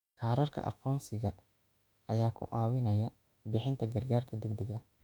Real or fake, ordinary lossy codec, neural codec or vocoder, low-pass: fake; none; autoencoder, 48 kHz, 32 numbers a frame, DAC-VAE, trained on Japanese speech; 19.8 kHz